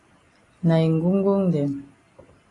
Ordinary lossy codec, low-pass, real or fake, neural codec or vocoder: AAC, 32 kbps; 10.8 kHz; real; none